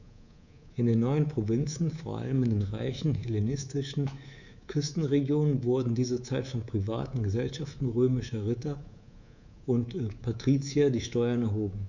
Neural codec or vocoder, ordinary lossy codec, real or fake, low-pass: codec, 24 kHz, 3.1 kbps, DualCodec; none; fake; 7.2 kHz